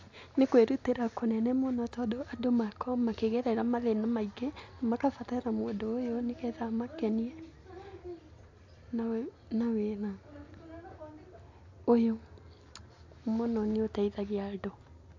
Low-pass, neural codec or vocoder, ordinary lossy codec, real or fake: 7.2 kHz; none; AAC, 48 kbps; real